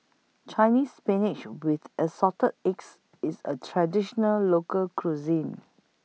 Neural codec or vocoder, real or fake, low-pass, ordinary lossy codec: none; real; none; none